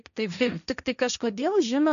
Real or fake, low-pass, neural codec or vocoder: fake; 7.2 kHz; codec, 16 kHz, 1.1 kbps, Voila-Tokenizer